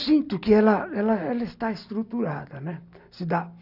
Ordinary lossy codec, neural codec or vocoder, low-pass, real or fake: none; none; 5.4 kHz; real